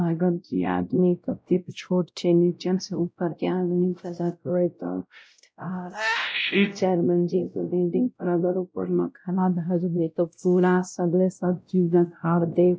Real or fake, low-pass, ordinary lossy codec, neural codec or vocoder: fake; none; none; codec, 16 kHz, 0.5 kbps, X-Codec, WavLM features, trained on Multilingual LibriSpeech